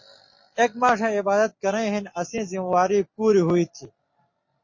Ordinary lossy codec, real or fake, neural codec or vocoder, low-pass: MP3, 32 kbps; real; none; 7.2 kHz